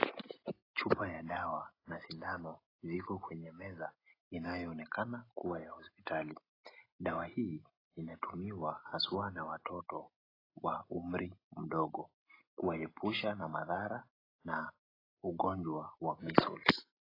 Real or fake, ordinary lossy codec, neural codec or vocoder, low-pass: real; AAC, 24 kbps; none; 5.4 kHz